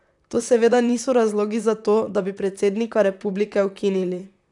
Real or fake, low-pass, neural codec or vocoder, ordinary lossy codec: fake; 10.8 kHz; vocoder, 44.1 kHz, 128 mel bands, Pupu-Vocoder; none